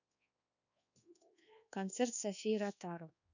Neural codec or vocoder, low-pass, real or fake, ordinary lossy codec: codec, 24 kHz, 1.2 kbps, DualCodec; 7.2 kHz; fake; none